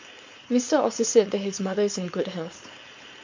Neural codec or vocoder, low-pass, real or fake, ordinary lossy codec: codec, 16 kHz, 4.8 kbps, FACodec; 7.2 kHz; fake; MP3, 48 kbps